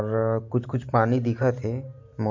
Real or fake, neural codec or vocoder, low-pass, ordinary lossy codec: real; none; 7.2 kHz; MP3, 48 kbps